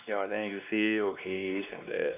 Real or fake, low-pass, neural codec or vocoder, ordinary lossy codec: fake; 3.6 kHz; codec, 16 kHz, 2 kbps, X-Codec, WavLM features, trained on Multilingual LibriSpeech; none